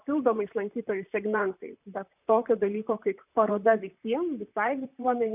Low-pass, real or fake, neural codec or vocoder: 3.6 kHz; fake; vocoder, 44.1 kHz, 128 mel bands, Pupu-Vocoder